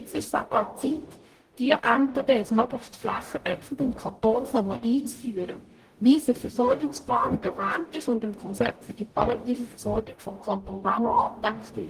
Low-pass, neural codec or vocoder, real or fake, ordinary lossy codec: 14.4 kHz; codec, 44.1 kHz, 0.9 kbps, DAC; fake; Opus, 24 kbps